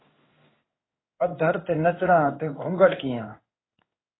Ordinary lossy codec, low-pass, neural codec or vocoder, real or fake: AAC, 16 kbps; 7.2 kHz; codec, 16 kHz in and 24 kHz out, 1 kbps, XY-Tokenizer; fake